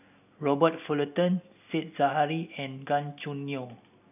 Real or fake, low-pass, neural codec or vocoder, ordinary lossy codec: real; 3.6 kHz; none; none